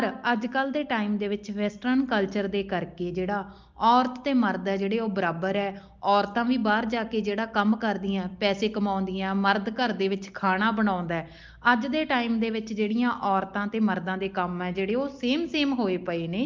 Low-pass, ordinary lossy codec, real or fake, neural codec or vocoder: 7.2 kHz; Opus, 24 kbps; real; none